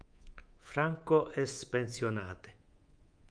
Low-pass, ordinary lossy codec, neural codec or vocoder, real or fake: 9.9 kHz; Opus, 32 kbps; codec, 24 kHz, 3.1 kbps, DualCodec; fake